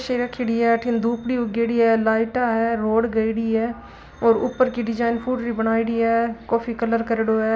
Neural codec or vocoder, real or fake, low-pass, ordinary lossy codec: none; real; none; none